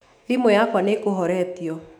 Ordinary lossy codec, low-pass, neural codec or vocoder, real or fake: none; 19.8 kHz; autoencoder, 48 kHz, 128 numbers a frame, DAC-VAE, trained on Japanese speech; fake